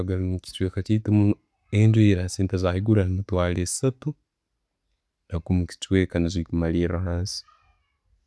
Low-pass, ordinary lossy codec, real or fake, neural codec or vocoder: none; none; real; none